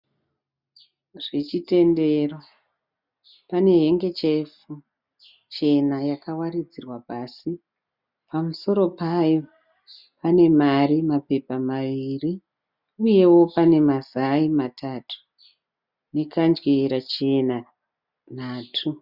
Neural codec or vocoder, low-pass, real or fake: none; 5.4 kHz; real